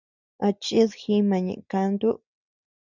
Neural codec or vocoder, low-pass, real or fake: none; 7.2 kHz; real